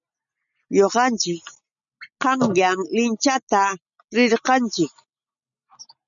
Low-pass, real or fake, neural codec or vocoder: 7.2 kHz; real; none